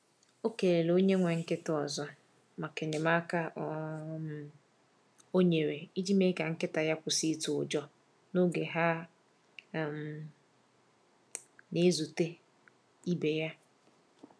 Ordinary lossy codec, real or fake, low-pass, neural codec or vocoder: none; real; none; none